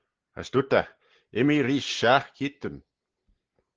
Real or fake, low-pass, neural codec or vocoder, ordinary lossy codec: real; 7.2 kHz; none; Opus, 16 kbps